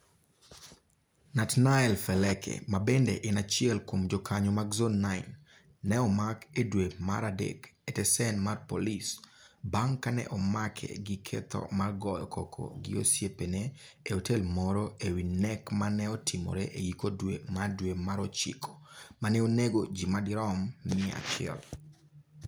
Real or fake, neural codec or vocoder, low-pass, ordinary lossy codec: real; none; none; none